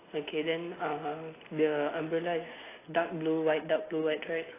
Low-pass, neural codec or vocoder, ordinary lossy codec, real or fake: 3.6 kHz; none; AAC, 16 kbps; real